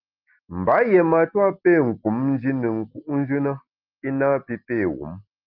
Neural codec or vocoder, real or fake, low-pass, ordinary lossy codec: none; real; 5.4 kHz; Opus, 32 kbps